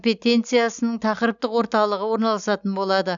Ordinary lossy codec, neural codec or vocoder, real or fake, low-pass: none; none; real; 7.2 kHz